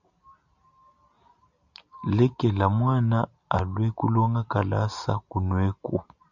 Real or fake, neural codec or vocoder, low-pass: real; none; 7.2 kHz